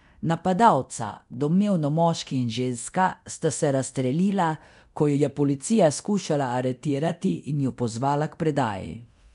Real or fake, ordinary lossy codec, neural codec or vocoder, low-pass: fake; none; codec, 24 kHz, 0.9 kbps, DualCodec; 10.8 kHz